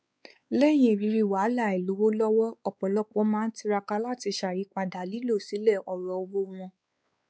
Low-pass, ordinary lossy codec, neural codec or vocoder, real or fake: none; none; codec, 16 kHz, 4 kbps, X-Codec, WavLM features, trained on Multilingual LibriSpeech; fake